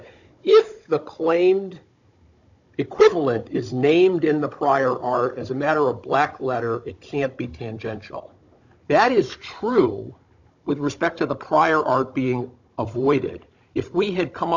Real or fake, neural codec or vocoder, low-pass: fake; codec, 16 kHz, 16 kbps, FunCodec, trained on Chinese and English, 50 frames a second; 7.2 kHz